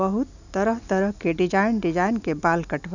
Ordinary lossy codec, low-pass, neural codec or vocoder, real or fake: none; 7.2 kHz; none; real